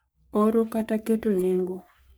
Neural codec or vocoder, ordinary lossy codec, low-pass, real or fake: codec, 44.1 kHz, 3.4 kbps, Pupu-Codec; none; none; fake